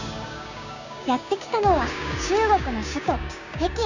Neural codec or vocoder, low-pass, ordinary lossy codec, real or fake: codec, 16 kHz, 6 kbps, DAC; 7.2 kHz; none; fake